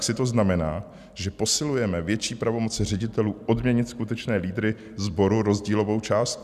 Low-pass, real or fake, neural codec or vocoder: 14.4 kHz; real; none